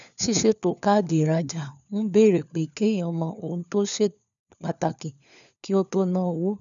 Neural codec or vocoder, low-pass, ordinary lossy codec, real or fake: codec, 16 kHz, 4 kbps, FunCodec, trained on LibriTTS, 50 frames a second; 7.2 kHz; none; fake